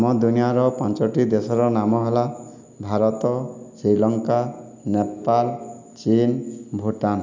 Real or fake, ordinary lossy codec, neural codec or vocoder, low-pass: real; MP3, 64 kbps; none; 7.2 kHz